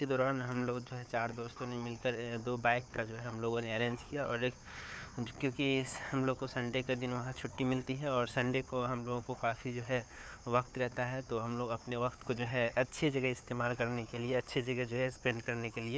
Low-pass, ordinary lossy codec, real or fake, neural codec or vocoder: none; none; fake; codec, 16 kHz, 4 kbps, FunCodec, trained on Chinese and English, 50 frames a second